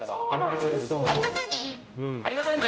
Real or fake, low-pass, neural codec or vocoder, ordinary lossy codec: fake; none; codec, 16 kHz, 0.5 kbps, X-Codec, HuBERT features, trained on general audio; none